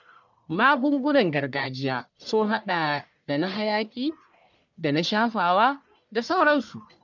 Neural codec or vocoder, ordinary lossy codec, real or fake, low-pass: codec, 44.1 kHz, 1.7 kbps, Pupu-Codec; none; fake; 7.2 kHz